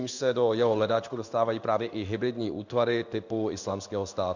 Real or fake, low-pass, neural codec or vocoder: fake; 7.2 kHz; codec, 16 kHz in and 24 kHz out, 1 kbps, XY-Tokenizer